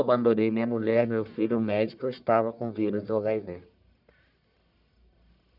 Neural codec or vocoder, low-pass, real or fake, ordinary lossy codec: codec, 44.1 kHz, 1.7 kbps, Pupu-Codec; 5.4 kHz; fake; none